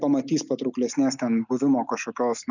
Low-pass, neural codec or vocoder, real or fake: 7.2 kHz; none; real